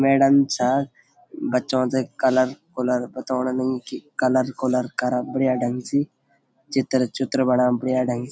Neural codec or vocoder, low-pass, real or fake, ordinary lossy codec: none; none; real; none